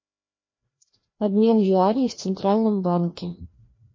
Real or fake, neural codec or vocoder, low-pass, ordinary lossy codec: fake; codec, 16 kHz, 1 kbps, FreqCodec, larger model; 7.2 kHz; MP3, 32 kbps